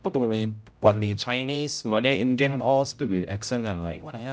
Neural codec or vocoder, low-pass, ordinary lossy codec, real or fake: codec, 16 kHz, 0.5 kbps, X-Codec, HuBERT features, trained on general audio; none; none; fake